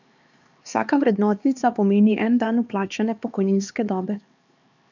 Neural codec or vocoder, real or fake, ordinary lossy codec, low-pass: codec, 16 kHz, 2 kbps, X-Codec, HuBERT features, trained on LibriSpeech; fake; none; 7.2 kHz